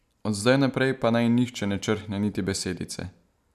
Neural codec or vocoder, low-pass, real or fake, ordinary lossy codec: none; 14.4 kHz; real; none